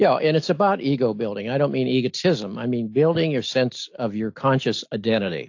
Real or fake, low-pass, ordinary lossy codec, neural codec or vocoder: real; 7.2 kHz; AAC, 48 kbps; none